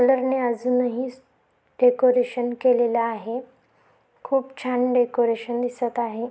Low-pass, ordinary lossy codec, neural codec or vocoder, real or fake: none; none; none; real